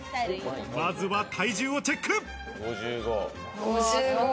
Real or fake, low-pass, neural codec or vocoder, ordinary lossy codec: real; none; none; none